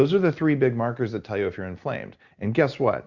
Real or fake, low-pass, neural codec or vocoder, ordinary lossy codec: real; 7.2 kHz; none; Opus, 64 kbps